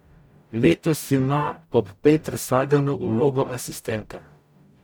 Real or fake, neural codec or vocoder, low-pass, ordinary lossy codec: fake; codec, 44.1 kHz, 0.9 kbps, DAC; none; none